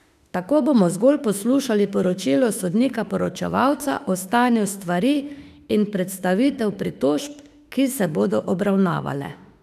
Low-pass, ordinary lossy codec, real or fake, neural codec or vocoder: 14.4 kHz; none; fake; autoencoder, 48 kHz, 32 numbers a frame, DAC-VAE, trained on Japanese speech